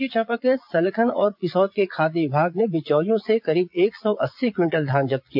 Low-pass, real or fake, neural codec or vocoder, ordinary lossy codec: 5.4 kHz; fake; vocoder, 22.05 kHz, 80 mel bands, Vocos; AAC, 48 kbps